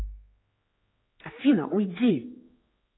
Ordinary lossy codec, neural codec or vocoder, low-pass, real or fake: AAC, 16 kbps; codec, 16 kHz, 4 kbps, X-Codec, HuBERT features, trained on general audio; 7.2 kHz; fake